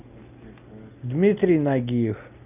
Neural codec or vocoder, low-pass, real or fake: none; 3.6 kHz; real